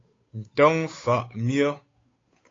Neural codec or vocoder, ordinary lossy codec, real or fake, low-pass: codec, 16 kHz, 8 kbps, FunCodec, trained on Chinese and English, 25 frames a second; AAC, 32 kbps; fake; 7.2 kHz